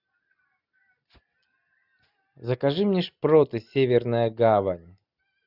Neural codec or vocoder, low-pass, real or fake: none; 5.4 kHz; real